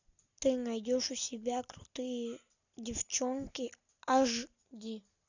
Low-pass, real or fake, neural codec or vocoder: 7.2 kHz; real; none